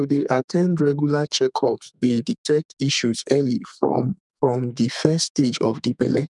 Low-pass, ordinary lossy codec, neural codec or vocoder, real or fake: 10.8 kHz; none; codec, 32 kHz, 1.9 kbps, SNAC; fake